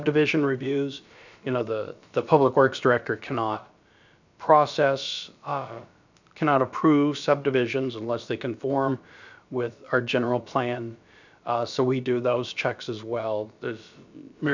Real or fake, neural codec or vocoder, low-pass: fake; codec, 16 kHz, about 1 kbps, DyCAST, with the encoder's durations; 7.2 kHz